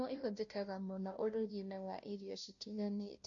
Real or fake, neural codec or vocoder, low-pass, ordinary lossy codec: fake; codec, 16 kHz, 0.5 kbps, FunCodec, trained on Chinese and English, 25 frames a second; 7.2 kHz; MP3, 48 kbps